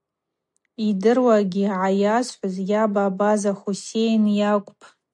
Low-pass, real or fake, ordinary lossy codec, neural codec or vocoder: 10.8 kHz; real; MP3, 64 kbps; none